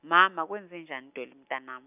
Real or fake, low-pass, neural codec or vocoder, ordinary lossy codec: real; 3.6 kHz; none; none